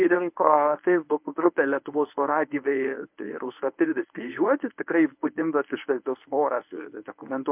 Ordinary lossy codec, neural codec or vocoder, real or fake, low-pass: MP3, 32 kbps; codec, 24 kHz, 0.9 kbps, WavTokenizer, medium speech release version 1; fake; 3.6 kHz